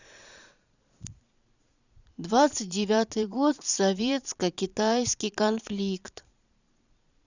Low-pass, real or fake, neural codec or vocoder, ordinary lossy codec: 7.2 kHz; fake; vocoder, 22.05 kHz, 80 mel bands, Vocos; none